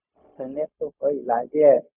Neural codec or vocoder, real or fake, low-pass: codec, 16 kHz, 0.4 kbps, LongCat-Audio-Codec; fake; 3.6 kHz